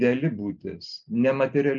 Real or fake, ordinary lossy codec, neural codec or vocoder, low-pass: real; MP3, 96 kbps; none; 7.2 kHz